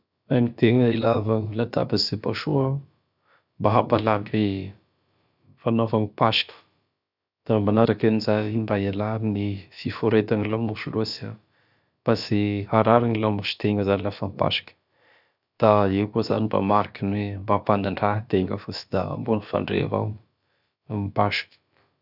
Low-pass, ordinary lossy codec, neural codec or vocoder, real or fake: 5.4 kHz; none; codec, 16 kHz, about 1 kbps, DyCAST, with the encoder's durations; fake